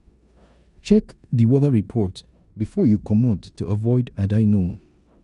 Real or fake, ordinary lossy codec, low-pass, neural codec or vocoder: fake; none; 10.8 kHz; codec, 16 kHz in and 24 kHz out, 0.9 kbps, LongCat-Audio-Codec, four codebook decoder